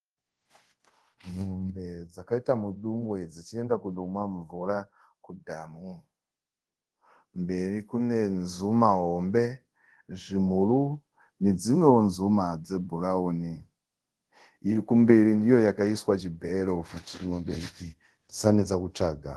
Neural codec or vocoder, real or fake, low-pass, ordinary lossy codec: codec, 24 kHz, 0.5 kbps, DualCodec; fake; 10.8 kHz; Opus, 16 kbps